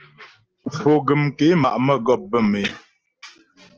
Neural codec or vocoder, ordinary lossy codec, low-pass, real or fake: none; Opus, 16 kbps; 7.2 kHz; real